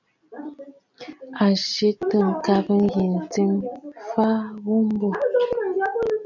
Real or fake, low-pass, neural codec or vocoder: real; 7.2 kHz; none